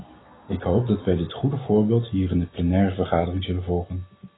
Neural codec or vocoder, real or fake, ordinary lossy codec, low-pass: none; real; AAC, 16 kbps; 7.2 kHz